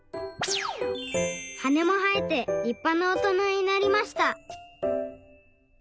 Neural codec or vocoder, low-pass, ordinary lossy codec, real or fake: none; none; none; real